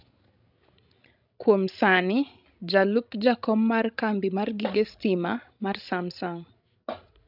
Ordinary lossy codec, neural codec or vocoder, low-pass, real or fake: none; codec, 16 kHz, 16 kbps, FreqCodec, larger model; 5.4 kHz; fake